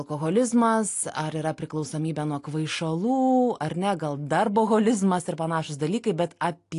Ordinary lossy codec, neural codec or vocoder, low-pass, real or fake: AAC, 48 kbps; none; 10.8 kHz; real